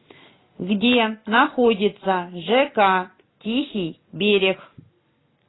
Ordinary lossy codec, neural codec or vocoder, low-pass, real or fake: AAC, 16 kbps; none; 7.2 kHz; real